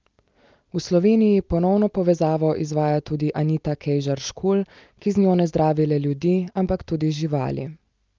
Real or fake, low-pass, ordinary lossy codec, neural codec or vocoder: real; 7.2 kHz; Opus, 24 kbps; none